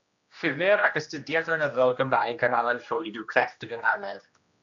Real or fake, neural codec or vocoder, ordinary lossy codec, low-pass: fake; codec, 16 kHz, 1 kbps, X-Codec, HuBERT features, trained on general audio; AAC, 64 kbps; 7.2 kHz